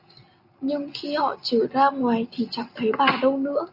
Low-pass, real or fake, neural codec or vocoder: 5.4 kHz; real; none